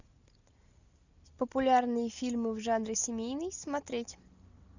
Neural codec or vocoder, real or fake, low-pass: none; real; 7.2 kHz